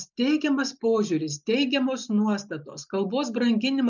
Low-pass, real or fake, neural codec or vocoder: 7.2 kHz; real; none